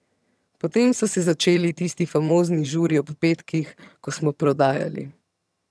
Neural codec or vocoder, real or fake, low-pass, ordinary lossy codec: vocoder, 22.05 kHz, 80 mel bands, HiFi-GAN; fake; none; none